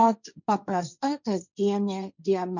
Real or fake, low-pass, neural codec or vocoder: fake; 7.2 kHz; codec, 16 kHz, 1.1 kbps, Voila-Tokenizer